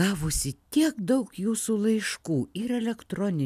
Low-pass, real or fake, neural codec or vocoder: 14.4 kHz; real; none